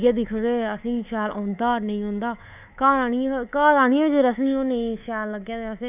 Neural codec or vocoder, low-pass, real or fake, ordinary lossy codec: codec, 24 kHz, 3.1 kbps, DualCodec; 3.6 kHz; fake; none